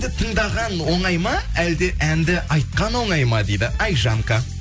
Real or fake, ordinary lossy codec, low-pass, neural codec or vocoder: real; none; none; none